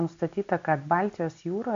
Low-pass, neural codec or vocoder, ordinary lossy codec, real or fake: 7.2 kHz; none; MP3, 64 kbps; real